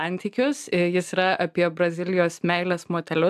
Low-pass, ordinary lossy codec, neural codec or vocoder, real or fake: 14.4 kHz; AAC, 96 kbps; none; real